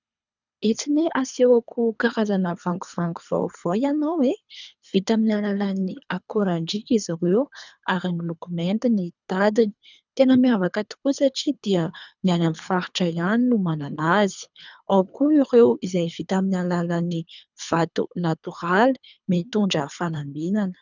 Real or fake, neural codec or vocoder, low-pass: fake; codec, 24 kHz, 3 kbps, HILCodec; 7.2 kHz